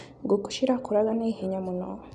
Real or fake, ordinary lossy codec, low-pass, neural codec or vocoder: real; none; 10.8 kHz; none